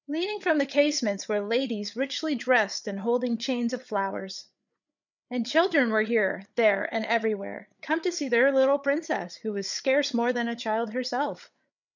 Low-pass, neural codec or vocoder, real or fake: 7.2 kHz; codec, 16 kHz, 8 kbps, FreqCodec, larger model; fake